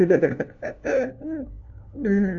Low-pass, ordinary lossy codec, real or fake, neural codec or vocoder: 7.2 kHz; none; fake; codec, 16 kHz, 1 kbps, FunCodec, trained on LibriTTS, 50 frames a second